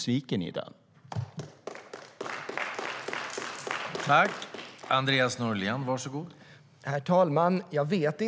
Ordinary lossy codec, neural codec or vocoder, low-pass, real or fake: none; none; none; real